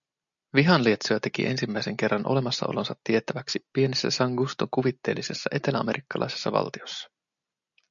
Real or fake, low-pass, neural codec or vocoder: real; 7.2 kHz; none